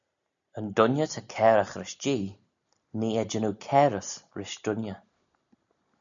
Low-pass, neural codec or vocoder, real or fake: 7.2 kHz; none; real